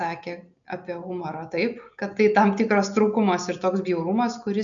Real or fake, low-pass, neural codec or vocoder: real; 7.2 kHz; none